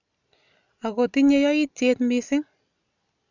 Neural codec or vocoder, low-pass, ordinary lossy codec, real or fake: none; 7.2 kHz; none; real